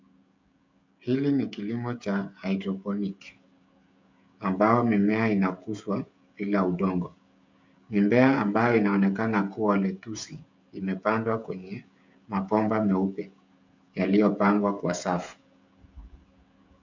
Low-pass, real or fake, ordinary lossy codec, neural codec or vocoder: 7.2 kHz; fake; MP3, 64 kbps; codec, 16 kHz, 16 kbps, FreqCodec, smaller model